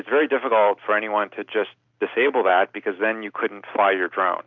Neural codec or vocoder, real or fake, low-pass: none; real; 7.2 kHz